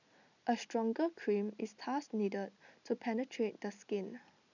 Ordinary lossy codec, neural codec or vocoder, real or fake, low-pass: none; none; real; 7.2 kHz